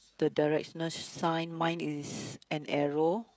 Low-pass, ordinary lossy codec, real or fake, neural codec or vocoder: none; none; fake; codec, 16 kHz, 16 kbps, FreqCodec, smaller model